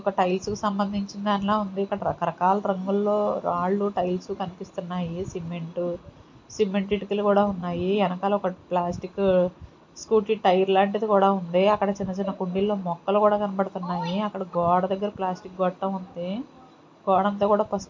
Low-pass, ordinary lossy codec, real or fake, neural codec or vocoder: 7.2 kHz; MP3, 48 kbps; real; none